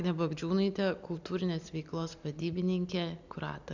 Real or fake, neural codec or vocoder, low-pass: real; none; 7.2 kHz